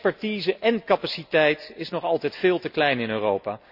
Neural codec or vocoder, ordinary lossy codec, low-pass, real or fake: none; none; 5.4 kHz; real